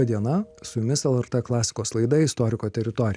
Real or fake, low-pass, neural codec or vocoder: real; 9.9 kHz; none